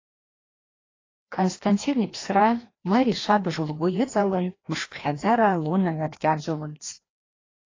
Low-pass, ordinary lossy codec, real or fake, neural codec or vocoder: 7.2 kHz; AAC, 32 kbps; fake; codec, 16 kHz, 1 kbps, FreqCodec, larger model